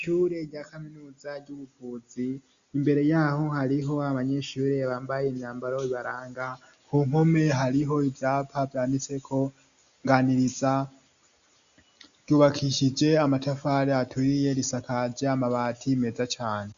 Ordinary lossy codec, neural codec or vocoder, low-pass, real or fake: AAC, 96 kbps; none; 7.2 kHz; real